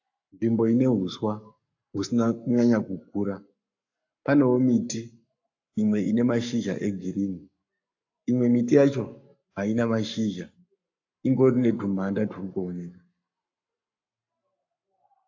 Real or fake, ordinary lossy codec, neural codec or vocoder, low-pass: fake; AAC, 48 kbps; codec, 44.1 kHz, 7.8 kbps, Pupu-Codec; 7.2 kHz